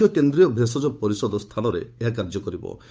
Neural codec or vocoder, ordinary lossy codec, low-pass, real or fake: codec, 16 kHz, 8 kbps, FunCodec, trained on Chinese and English, 25 frames a second; none; none; fake